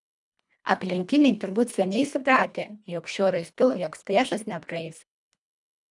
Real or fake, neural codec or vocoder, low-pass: fake; codec, 24 kHz, 1.5 kbps, HILCodec; 10.8 kHz